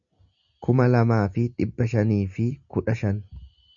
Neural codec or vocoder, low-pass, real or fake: none; 7.2 kHz; real